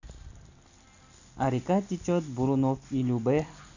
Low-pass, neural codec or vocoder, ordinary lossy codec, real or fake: 7.2 kHz; none; none; real